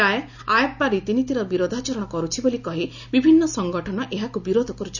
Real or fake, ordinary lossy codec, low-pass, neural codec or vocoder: real; none; 7.2 kHz; none